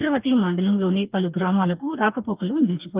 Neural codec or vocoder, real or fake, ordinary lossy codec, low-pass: codec, 44.1 kHz, 2.6 kbps, DAC; fake; Opus, 32 kbps; 3.6 kHz